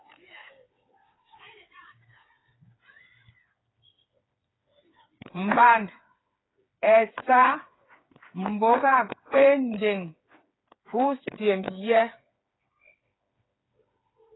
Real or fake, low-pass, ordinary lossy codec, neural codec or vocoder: fake; 7.2 kHz; AAC, 16 kbps; codec, 16 kHz, 4 kbps, FreqCodec, smaller model